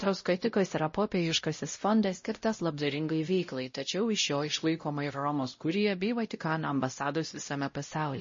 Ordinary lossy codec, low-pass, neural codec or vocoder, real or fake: MP3, 32 kbps; 7.2 kHz; codec, 16 kHz, 0.5 kbps, X-Codec, WavLM features, trained on Multilingual LibriSpeech; fake